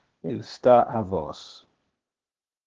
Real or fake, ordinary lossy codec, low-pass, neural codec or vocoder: fake; Opus, 16 kbps; 7.2 kHz; codec, 16 kHz, 0.8 kbps, ZipCodec